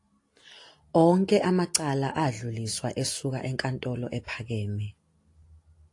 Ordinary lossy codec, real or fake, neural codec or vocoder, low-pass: AAC, 48 kbps; real; none; 10.8 kHz